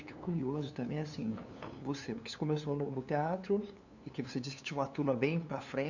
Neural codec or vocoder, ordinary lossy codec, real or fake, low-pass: codec, 16 kHz, 2 kbps, FunCodec, trained on LibriTTS, 25 frames a second; none; fake; 7.2 kHz